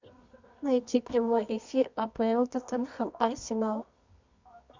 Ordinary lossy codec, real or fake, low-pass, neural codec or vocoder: MP3, 64 kbps; fake; 7.2 kHz; codec, 24 kHz, 0.9 kbps, WavTokenizer, medium music audio release